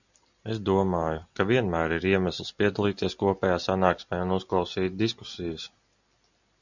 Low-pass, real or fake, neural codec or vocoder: 7.2 kHz; real; none